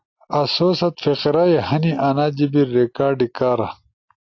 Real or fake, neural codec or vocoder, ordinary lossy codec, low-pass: real; none; AAC, 48 kbps; 7.2 kHz